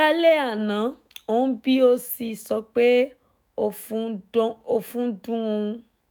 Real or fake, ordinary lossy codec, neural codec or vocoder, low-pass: fake; none; autoencoder, 48 kHz, 128 numbers a frame, DAC-VAE, trained on Japanese speech; none